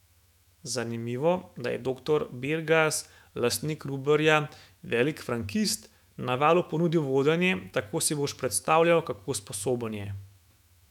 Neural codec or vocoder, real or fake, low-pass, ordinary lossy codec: autoencoder, 48 kHz, 128 numbers a frame, DAC-VAE, trained on Japanese speech; fake; 19.8 kHz; none